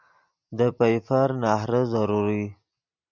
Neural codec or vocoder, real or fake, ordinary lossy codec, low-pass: none; real; MP3, 64 kbps; 7.2 kHz